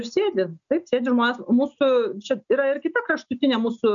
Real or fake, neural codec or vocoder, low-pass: real; none; 7.2 kHz